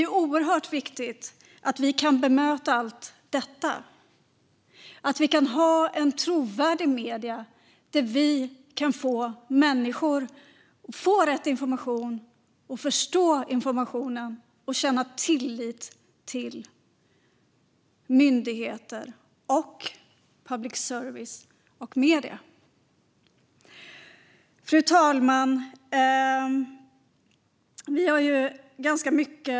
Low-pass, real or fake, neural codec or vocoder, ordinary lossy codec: none; real; none; none